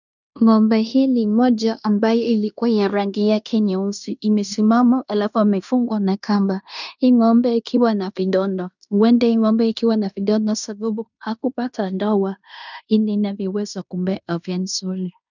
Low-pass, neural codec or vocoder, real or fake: 7.2 kHz; codec, 16 kHz in and 24 kHz out, 0.9 kbps, LongCat-Audio-Codec, fine tuned four codebook decoder; fake